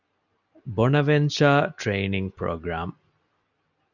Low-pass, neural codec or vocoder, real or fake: 7.2 kHz; none; real